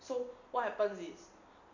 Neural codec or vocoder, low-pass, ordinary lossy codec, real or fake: none; 7.2 kHz; MP3, 64 kbps; real